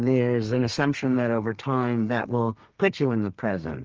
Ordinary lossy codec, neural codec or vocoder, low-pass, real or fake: Opus, 24 kbps; codec, 32 kHz, 1.9 kbps, SNAC; 7.2 kHz; fake